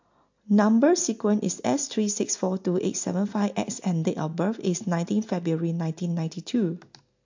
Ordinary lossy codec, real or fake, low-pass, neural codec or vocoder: MP3, 48 kbps; real; 7.2 kHz; none